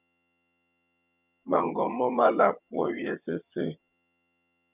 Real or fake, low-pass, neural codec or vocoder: fake; 3.6 kHz; vocoder, 22.05 kHz, 80 mel bands, HiFi-GAN